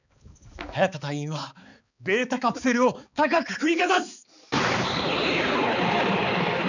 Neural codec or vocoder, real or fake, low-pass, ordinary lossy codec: codec, 16 kHz, 4 kbps, X-Codec, HuBERT features, trained on balanced general audio; fake; 7.2 kHz; none